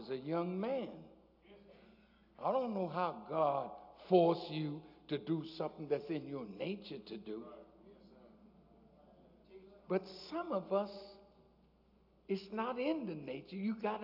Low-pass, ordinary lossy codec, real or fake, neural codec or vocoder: 5.4 kHz; AAC, 48 kbps; real; none